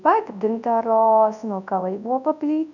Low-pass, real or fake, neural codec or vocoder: 7.2 kHz; fake; codec, 24 kHz, 0.9 kbps, WavTokenizer, large speech release